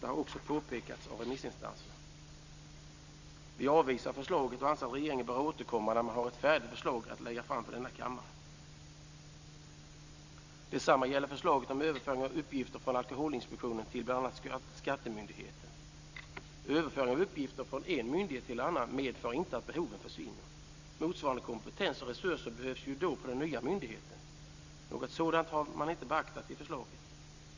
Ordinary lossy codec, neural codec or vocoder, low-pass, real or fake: Opus, 64 kbps; none; 7.2 kHz; real